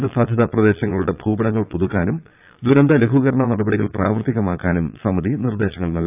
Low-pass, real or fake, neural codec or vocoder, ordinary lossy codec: 3.6 kHz; fake; vocoder, 22.05 kHz, 80 mel bands, Vocos; AAC, 32 kbps